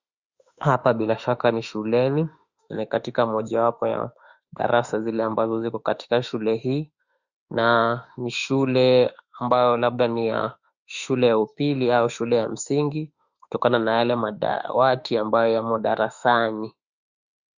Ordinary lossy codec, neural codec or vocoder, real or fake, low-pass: Opus, 64 kbps; autoencoder, 48 kHz, 32 numbers a frame, DAC-VAE, trained on Japanese speech; fake; 7.2 kHz